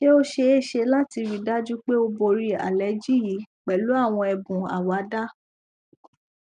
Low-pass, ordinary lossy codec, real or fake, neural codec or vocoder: 9.9 kHz; none; real; none